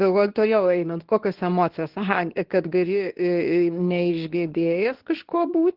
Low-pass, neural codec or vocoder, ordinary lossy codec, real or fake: 5.4 kHz; codec, 24 kHz, 0.9 kbps, WavTokenizer, medium speech release version 2; Opus, 16 kbps; fake